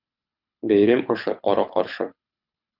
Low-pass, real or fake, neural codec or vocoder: 5.4 kHz; fake; codec, 24 kHz, 6 kbps, HILCodec